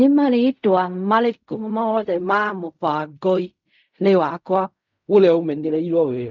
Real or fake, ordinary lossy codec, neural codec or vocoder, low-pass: fake; none; codec, 16 kHz in and 24 kHz out, 0.4 kbps, LongCat-Audio-Codec, fine tuned four codebook decoder; 7.2 kHz